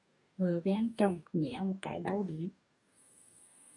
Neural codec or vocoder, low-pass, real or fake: codec, 44.1 kHz, 2.6 kbps, DAC; 10.8 kHz; fake